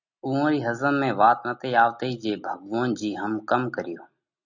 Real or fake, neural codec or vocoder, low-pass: real; none; 7.2 kHz